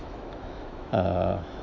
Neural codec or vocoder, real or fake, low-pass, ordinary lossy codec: autoencoder, 48 kHz, 128 numbers a frame, DAC-VAE, trained on Japanese speech; fake; 7.2 kHz; none